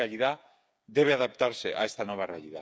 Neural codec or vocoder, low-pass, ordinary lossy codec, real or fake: codec, 16 kHz, 8 kbps, FreqCodec, smaller model; none; none; fake